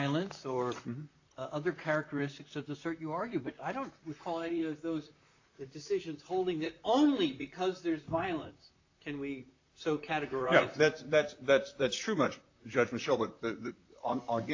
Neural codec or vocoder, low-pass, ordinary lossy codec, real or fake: vocoder, 44.1 kHz, 128 mel bands, Pupu-Vocoder; 7.2 kHz; AAC, 48 kbps; fake